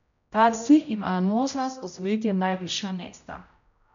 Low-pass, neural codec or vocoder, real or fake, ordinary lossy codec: 7.2 kHz; codec, 16 kHz, 0.5 kbps, X-Codec, HuBERT features, trained on general audio; fake; none